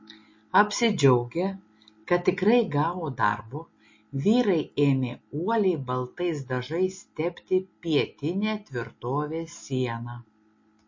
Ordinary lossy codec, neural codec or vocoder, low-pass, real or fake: MP3, 32 kbps; none; 7.2 kHz; real